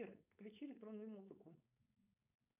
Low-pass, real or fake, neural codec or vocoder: 3.6 kHz; fake; codec, 16 kHz, 4.8 kbps, FACodec